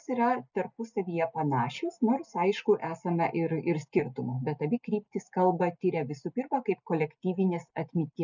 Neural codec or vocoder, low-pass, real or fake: none; 7.2 kHz; real